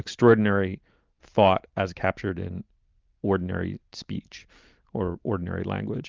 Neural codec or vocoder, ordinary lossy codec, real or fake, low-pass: none; Opus, 24 kbps; real; 7.2 kHz